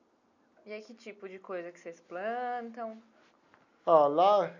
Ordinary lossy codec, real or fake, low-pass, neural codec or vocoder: none; real; 7.2 kHz; none